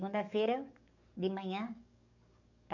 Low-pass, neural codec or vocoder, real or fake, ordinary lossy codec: 7.2 kHz; codec, 44.1 kHz, 7.8 kbps, DAC; fake; none